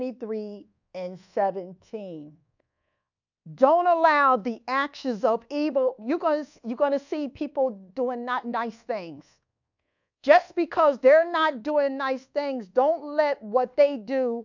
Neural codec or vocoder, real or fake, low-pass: codec, 24 kHz, 1.2 kbps, DualCodec; fake; 7.2 kHz